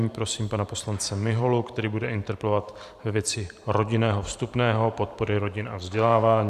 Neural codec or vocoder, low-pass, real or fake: vocoder, 44.1 kHz, 128 mel bands every 512 samples, BigVGAN v2; 14.4 kHz; fake